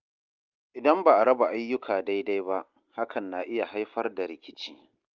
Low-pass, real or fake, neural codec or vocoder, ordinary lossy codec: 7.2 kHz; real; none; Opus, 24 kbps